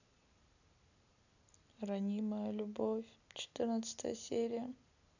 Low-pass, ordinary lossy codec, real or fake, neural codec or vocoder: 7.2 kHz; none; real; none